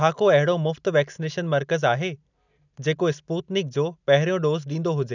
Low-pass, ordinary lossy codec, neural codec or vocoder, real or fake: 7.2 kHz; none; none; real